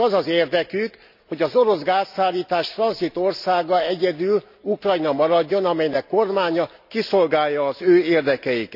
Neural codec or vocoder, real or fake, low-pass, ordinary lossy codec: none; real; 5.4 kHz; none